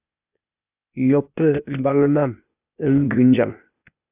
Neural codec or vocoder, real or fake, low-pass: codec, 16 kHz, 0.8 kbps, ZipCodec; fake; 3.6 kHz